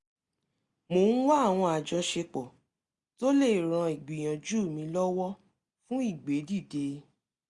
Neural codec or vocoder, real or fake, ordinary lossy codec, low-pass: none; real; Opus, 64 kbps; 10.8 kHz